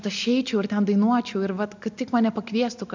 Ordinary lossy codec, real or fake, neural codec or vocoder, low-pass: MP3, 64 kbps; real; none; 7.2 kHz